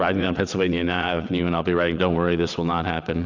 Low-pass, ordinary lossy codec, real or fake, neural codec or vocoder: 7.2 kHz; Opus, 64 kbps; fake; vocoder, 22.05 kHz, 80 mel bands, WaveNeXt